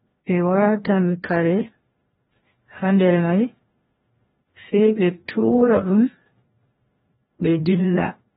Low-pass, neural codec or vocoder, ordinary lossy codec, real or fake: 7.2 kHz; codec, 16 kHz, 1 kbps, FreqCodec, larger model; AAC, 16 kbps; fake